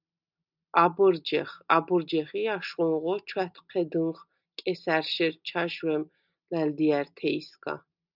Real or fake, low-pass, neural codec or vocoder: real; 5.4 kHz; none